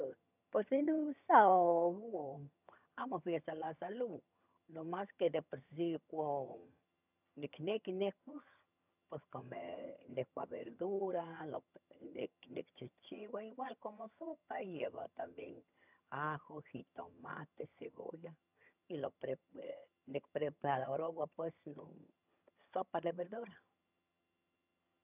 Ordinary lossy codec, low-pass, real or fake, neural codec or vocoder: none; 3.6 kHz; fake; vocoder, 22.05 kHz, 80 mel bands, HiFi-GAN